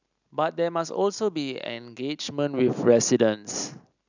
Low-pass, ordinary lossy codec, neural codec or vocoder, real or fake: 7.2 kHz; none; none; real